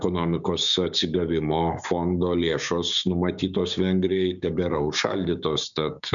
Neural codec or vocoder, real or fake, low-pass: codec, 16 kHz, 6 kbps, DAC; fake; 7.2 kHz